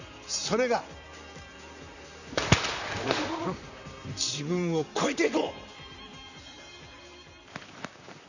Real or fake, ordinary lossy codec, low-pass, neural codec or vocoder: fake; none; 7.2 kHz; vocoder, 44.1 kHz, 128 mel bands, Pupu-Vocoder